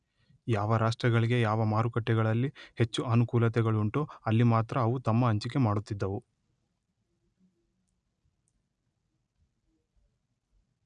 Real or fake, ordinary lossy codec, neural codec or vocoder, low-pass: real; none; none; 9.9 kHz